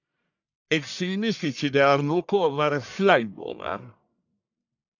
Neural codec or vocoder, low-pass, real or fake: codec, 44.1 kHz, 1.7 kbps, Pupu-Codec; 7.2 kHz; fake